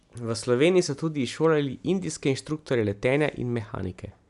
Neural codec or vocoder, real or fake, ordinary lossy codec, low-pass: none; real; none; 10.8 kHz